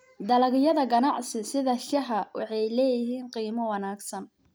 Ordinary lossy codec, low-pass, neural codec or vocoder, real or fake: none; none; none; real